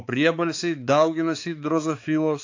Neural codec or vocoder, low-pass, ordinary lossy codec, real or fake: codec, 16 kHz, 4 kbps, X-Codec, HuBERT features, trained on balanced general audio; 7.2 kHz; AAC, 48 kbps; fake